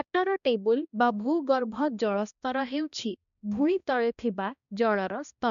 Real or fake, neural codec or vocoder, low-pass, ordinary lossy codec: fake; codec, 16 kHz, 1 kbps, X-Codec, HuBERT features, trained on balanced general audio; 7.2 kHz; none